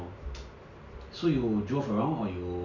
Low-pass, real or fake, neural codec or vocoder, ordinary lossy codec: 7.2 kHz; real; none; none